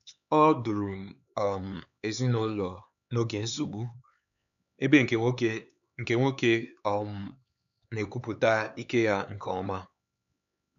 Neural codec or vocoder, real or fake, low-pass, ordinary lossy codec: codec, 16 kHz, 4 kbps, X-Codec, HuBERT features, trained on LibriSpeech; fake; 7.2 kHz; none